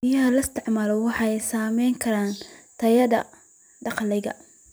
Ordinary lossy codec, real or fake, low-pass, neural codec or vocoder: none; fake; none; vocoder, 44.1 kHz, 128 mel bands every 256 samples, BigVGAN v2